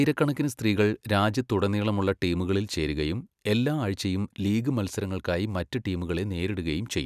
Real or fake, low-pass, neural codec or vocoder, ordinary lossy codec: real; 14.4 kHz; none; none